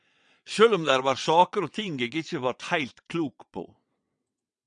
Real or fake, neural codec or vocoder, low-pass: fake; vocoder, 22.05 kHz, 80 mel bands, WaveNeXt; 9.9 kHz